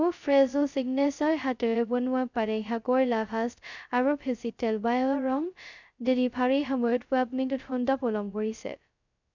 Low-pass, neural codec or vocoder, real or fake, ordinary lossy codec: 7.2 kHz; codec, 16 kHz, 0.2 kbps, FocalCodec; fake; none